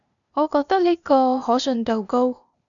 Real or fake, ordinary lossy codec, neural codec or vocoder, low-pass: fake; MP3, 96 kbps; codec, 16 kHz, 0.8 kbps, ZipCodec; 7.2 kHz